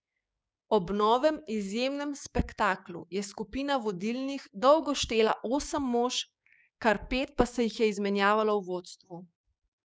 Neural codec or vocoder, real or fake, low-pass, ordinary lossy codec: codec, 16 kHz, 6 kbps, DAC; fake; none; none